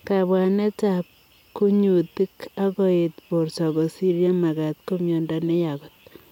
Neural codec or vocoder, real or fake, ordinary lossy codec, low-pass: none; real; none; 19.8 kHz